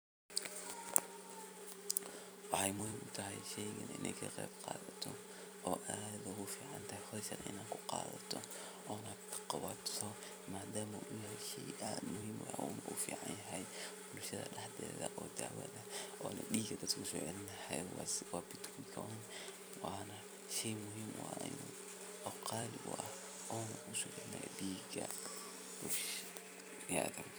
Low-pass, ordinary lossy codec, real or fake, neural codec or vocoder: none; none; fake; vocoder, 44.1 kHz, 128 mel bands every 256 samples, BigVGAN v2